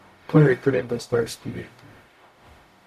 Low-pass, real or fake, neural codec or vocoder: 14.4 kHz; fake; codec, 44.1 kHz, 0.9 kbps, DAC